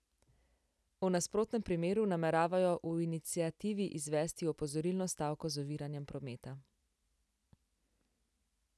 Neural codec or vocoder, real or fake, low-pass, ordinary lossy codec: none; real; none; none